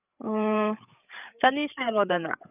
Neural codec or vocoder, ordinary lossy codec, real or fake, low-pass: codec, 16 kHz, 8 kbps, FreqCodec, larger model; none; fake; 3.6 kHz